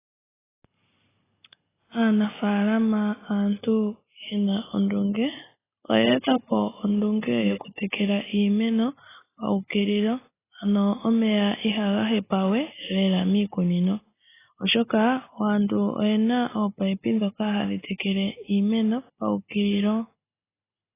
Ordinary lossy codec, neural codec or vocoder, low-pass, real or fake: AAC, 16 kbps; none; 3.6 kHz; real